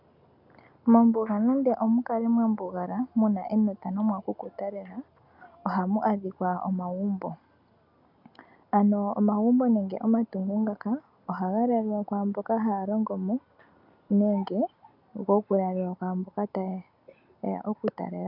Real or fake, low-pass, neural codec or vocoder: real; 5.4 kHz; none